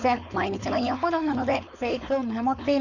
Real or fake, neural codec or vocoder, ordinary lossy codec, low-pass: fake; codec, 16 kHz, 4.8 kbps, FACodec; none; 7.2 kHz